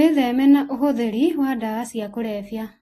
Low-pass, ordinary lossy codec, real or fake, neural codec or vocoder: 19.8 kHz; AAC, 32 kbps; real; none